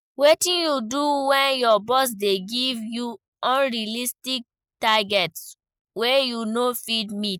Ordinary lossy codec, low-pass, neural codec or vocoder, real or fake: none; none; none; real